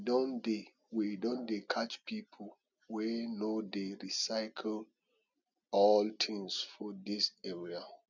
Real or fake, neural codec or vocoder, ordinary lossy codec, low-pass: real; none; none; 7.2 kHz